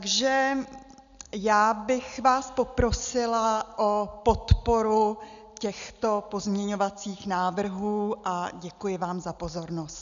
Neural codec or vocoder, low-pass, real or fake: none; 7.2 kHz; real